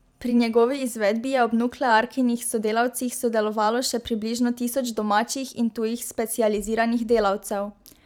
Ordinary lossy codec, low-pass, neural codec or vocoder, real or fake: none; 19.8 kHz; vocoder, 44.1 kHz, 128 mel bands every 512 samples, BigVGAN v2; fake